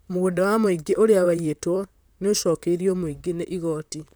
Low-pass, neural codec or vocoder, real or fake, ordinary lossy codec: none; vocoder, 44.1 kHz, 128 mel bands, Pupu-Vocoder; fake; none